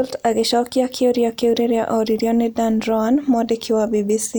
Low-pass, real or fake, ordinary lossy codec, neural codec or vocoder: none; real; none; none